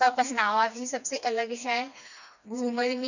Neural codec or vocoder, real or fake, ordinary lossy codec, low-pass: codec, 16 kHz, 2 kbps, FreqCodec, smaller model; fake; none; 7.2 kHz